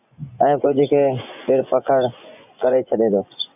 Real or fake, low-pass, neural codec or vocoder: fake; 3.6 kHz; vocoder, 24 kHz, 100 mel bands, Vocos